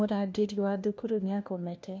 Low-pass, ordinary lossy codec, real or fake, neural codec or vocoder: none; none; fake; codec, 16 kHz, 1 kbps, FunCodec, trained on LibriTTS, 50 frames a second